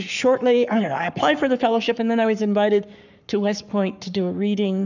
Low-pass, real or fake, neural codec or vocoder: 7.2 kHz; fake; codec, 44.1 kHz, 7.8 kbps, Pupu-Codec